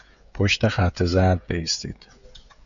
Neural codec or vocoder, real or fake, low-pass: codec, 16 kHz, 16 kbps, FreqCodec, smaller model; fake; 7.2 kHz